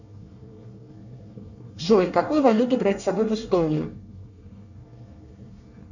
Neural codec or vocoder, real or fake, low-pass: codec, 24 kHz, 1 kbps, SNAC; fake; 7.2 kHz